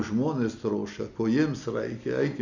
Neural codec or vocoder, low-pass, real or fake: none; 7.2 kHz; real